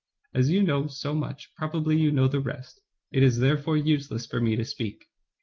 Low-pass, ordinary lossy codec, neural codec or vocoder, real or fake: 7.2 kHz; Opus, 32 kbps; none; real